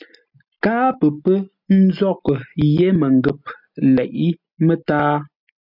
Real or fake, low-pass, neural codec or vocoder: real; 5.4 kHz; none